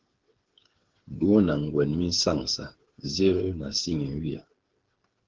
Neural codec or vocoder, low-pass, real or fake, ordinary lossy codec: codec, 16 kHz, 8 kbps, FreqCodec, smaller model; 7.2 kHz; fake; Opus, 16 kbps